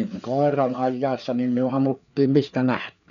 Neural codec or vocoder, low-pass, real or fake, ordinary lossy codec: codec, 16 kHz, 4 kbps, FreqCodec, larger model; 7.2 kHz; fake; none